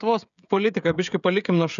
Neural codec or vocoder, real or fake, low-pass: codec, 16 kHz, 16 kbps, FreqCodec, smaller model; fake; 7.2 kHz